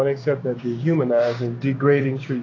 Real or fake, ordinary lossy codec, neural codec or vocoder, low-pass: fake; AAC, 32 kbps; codec, 16 kHz, 6 kbps, DAC; 7.2 kHz